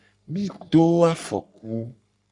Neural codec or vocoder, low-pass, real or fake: codec, 44.1 kHz, 3.4 kbps, Pupu-Codec; 10.8 kHz; fake